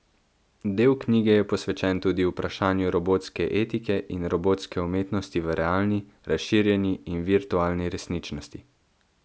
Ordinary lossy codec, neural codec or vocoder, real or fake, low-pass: none; none; real; none